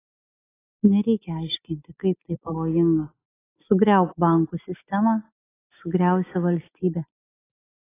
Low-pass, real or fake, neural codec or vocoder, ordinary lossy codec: 3.6 kHz; real; none; AAC, 16 kbps